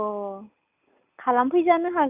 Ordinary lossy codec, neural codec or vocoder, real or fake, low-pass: none; none; real; 3.6 kHz